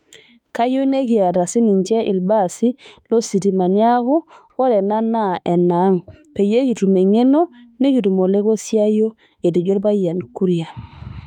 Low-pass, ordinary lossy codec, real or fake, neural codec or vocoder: 19.8 kHz; none; fake; autoencoder, 48 kHz, 32 numbers a frame, DAC-VAE, trained on Japanese speech